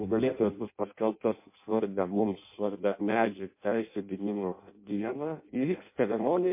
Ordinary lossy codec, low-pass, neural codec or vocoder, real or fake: MP3, 24 kbps; 3.6 kHz; codec, 16 kHz in and 24 kHz out, 0.6 kbps, FireRedTTS-2 codec; fake